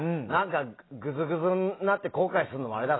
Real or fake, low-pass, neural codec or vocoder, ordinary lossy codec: real; 7.2 kHz; none; AAC, 16 kbps